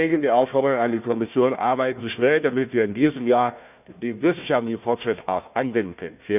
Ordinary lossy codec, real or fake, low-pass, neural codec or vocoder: none; fake; 3.6 kHz; codec, 16 kHz, 1 kbps, FunCodec, trained on Chinese and English, 50 frames a second